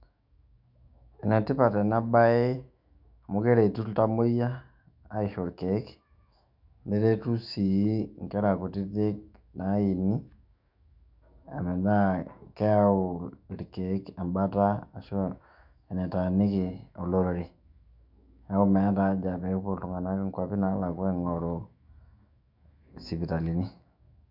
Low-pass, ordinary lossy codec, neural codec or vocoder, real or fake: 5.4 kHz; MP3, 48 kbps; autoencoder, 48 kHz, 128 numbers a frame, DAC-VAE, trained on Japanese speech; fake